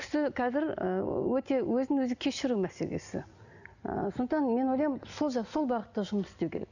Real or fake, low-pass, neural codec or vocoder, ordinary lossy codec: real; 7.2 kHz; none; none